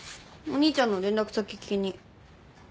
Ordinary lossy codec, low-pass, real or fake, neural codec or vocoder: none; none; real; none